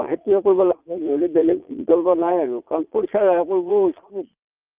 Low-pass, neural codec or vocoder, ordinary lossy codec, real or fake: 3.6 kHz; vocoder, 22.05 kHz, 80 mel bands, Vocos; Opus, 32 kbps; fake